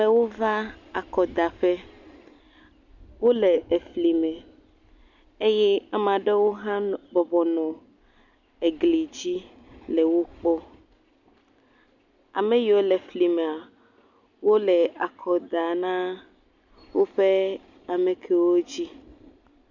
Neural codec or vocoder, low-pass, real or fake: none; 7.2 kHz; real